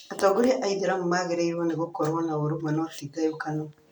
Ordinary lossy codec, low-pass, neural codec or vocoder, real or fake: none; 19.8 kHz; none; real